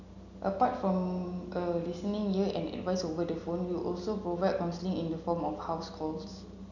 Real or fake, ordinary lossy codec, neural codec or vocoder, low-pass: real; none; none; 7.2 kHz